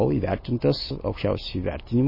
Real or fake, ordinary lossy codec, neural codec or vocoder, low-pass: real; MP3, 24 kbps; none; 5.4 kHz